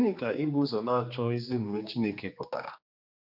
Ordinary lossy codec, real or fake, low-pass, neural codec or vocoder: none; fake; 5.4 kHz; codec, 16 kHz, 2 kbps, X-Codec, HuBERT features, trained on general audio